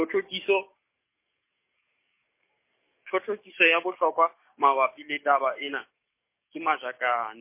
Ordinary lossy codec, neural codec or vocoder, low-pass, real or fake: MP3, 24 kbps; codec, 44.1 kHz, 7.8 kbps, DAC; 3.6 kHz; fake